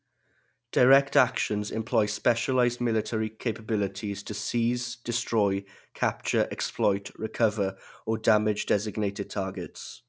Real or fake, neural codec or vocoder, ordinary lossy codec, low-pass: real; none; none; none